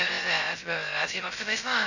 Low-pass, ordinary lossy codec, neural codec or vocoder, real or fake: 7.2 kHz; none; codec, 16 kHz, 0.2 kbps, FocalCodec; fake